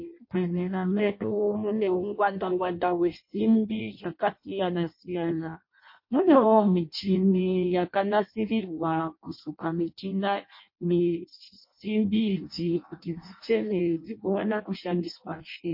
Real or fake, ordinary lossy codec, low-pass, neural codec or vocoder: fake; MP3, 32 kbps; 5.4 kHz; codec, 16 kHz in and 24 kHz out, 0.6 kbps, FireRedTTS-2 codec